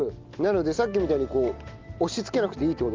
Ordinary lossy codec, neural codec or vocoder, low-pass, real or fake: Opus, 32 kbps; none; 7.2 kHz; real